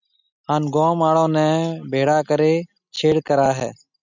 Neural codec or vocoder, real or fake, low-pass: none; real; 7.2 kHz